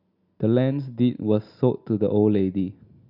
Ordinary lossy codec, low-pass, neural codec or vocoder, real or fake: Opus, 64 kbps; 5.4 kHz; none; real